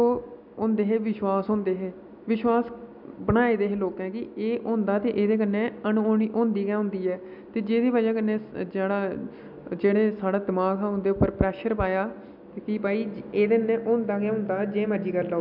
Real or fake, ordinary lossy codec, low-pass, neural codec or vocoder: real; none; 5.4 kHz; none